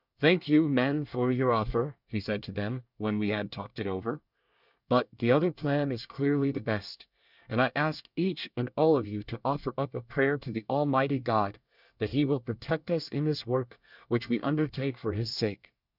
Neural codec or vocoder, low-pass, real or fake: codec, 24 kHz, 1 kbps, SNAC; 5.4 kHz; fake